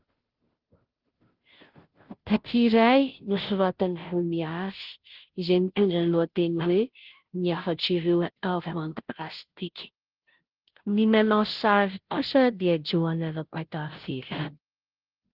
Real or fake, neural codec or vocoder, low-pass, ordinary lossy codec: fake; codec, 16 kHz, 0.5 kbps, FunCodec, trained on Chinese and English, 25 frames a second; 5.4 kHz; Opus, 16 kbps